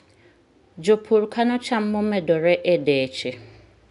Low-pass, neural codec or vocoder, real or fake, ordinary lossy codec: 10.8 kHz; none; real; none